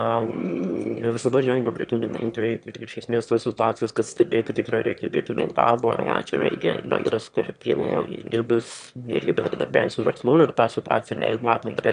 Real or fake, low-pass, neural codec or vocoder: fake; 9.9 kHz; autoencoder, 22.05 kHz, a latent of 192 numbers a frame, VITS, trained on one speaker